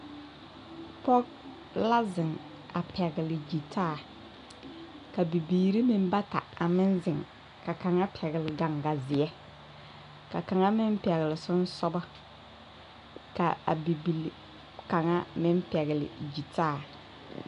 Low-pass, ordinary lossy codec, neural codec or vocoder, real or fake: 10.8 kHz; AAC, 96 kbps; none; real